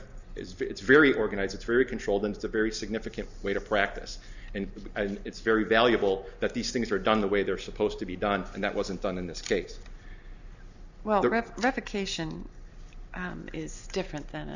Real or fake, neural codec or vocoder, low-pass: real; none; 7.2 kHz